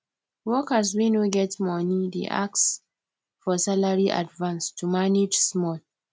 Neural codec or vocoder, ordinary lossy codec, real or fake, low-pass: none; none; real; none